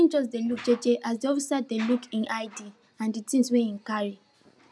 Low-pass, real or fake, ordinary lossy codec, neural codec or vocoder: none; real; none; none